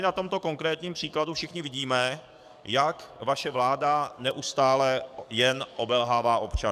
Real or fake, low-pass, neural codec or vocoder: fake; 14.4 kHz; codec, 44.1 kHz, 7.8 kbps, DAC